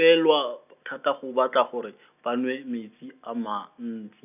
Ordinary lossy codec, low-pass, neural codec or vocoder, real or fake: none; 3.6 kHz; none; real